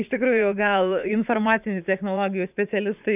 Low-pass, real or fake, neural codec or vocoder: 3.6 kHz; fake; vocoder, 44.1 kHz, 80 mel bands, Vocos